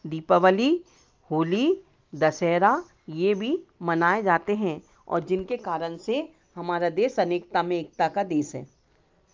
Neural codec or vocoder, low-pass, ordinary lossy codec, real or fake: none; 7.2 kHz; Opus, 24 kbps; real